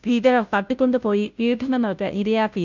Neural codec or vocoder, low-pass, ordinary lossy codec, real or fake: codec, 16 kHz, 0.5 kbps, FunCodec, trained on Chinese and English, 25 frames a second; 7.2 kHz; none; fake